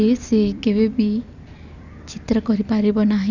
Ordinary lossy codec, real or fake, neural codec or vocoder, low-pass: none; fake; vocoder, 44.1 kHz, 128 mel bands every 256 samples, BigVGAN v2; 7.2 kHz